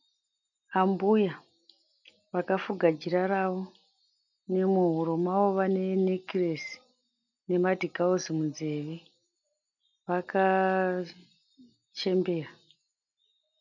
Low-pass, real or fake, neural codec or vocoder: 7.2 kHz; real; none